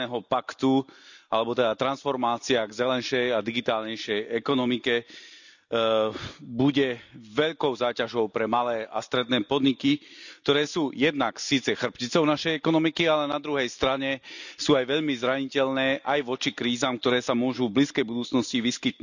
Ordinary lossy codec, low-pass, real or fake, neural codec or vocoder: none; 7.2 kHz; real; none